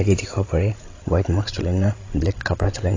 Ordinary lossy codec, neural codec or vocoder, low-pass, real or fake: AAC, 32 kbps; codec, 16 kHz, 16 kbps, FreqCodec, larger model; 7.2 kHz; fake